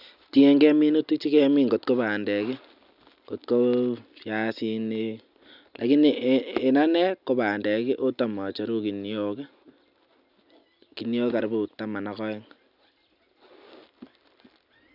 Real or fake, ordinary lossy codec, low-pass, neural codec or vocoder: real; none; 5.4 kHz; none